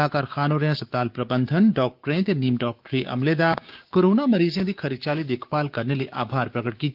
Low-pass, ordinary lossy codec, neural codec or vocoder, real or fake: 5.4 kHz; Opus, 24 kbps; codec, 16 kHz, 6 kbps, DAC; fake